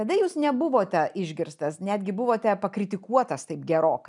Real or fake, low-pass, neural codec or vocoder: real; 10.8 kHz; none